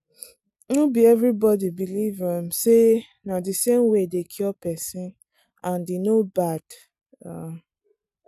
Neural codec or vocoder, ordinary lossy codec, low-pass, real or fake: none; none; 14.4 kHz; real